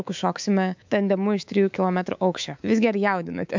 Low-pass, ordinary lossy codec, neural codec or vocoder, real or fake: 7.2 kHz; MP3, 64 kbps; codec, 24 kHz, 3.1 kbps, DualCodec; fake